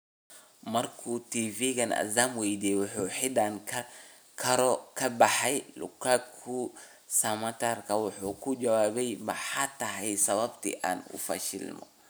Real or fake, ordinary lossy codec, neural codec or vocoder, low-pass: real; none; none; none